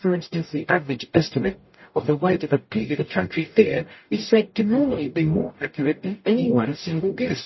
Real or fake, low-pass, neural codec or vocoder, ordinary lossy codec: fake; 7.2 kHz; codec, 44.1 kHz, 0.9 kbps, DAC; MP3, 24 kbps